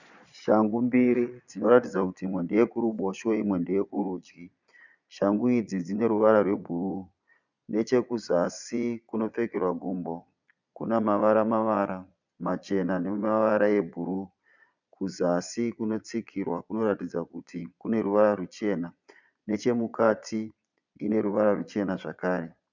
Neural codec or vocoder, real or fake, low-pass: vocoder, 22.05 kHz, 80 mel bands, Vocos; fake; 7.2 kHz